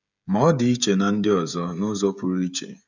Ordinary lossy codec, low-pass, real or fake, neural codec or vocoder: none; 7.2 kHz; fake; codec, 16 kHz, 16 kbps, FreqCodec, smaller model